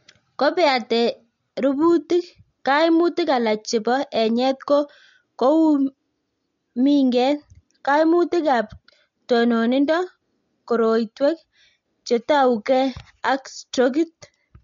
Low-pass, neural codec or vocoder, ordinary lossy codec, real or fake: 7.2 kHz; none; MP3, 48 kbps; real